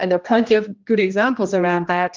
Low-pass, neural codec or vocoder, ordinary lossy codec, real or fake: 7.2 kHz; codec, 16 kHz, 1 kbps, X-Codec, HuBERT features, trained on general audio; Opus, 32 kbps; fake